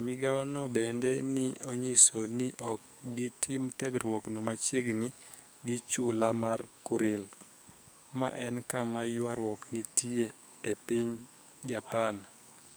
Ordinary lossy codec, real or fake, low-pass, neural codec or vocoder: none; fake; none; codec, 44.1 kHz, 2.6 kbps, SNAC